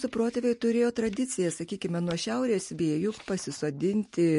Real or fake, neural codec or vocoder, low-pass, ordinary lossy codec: real; none; 10.8 kHz; MP3, 48 kbps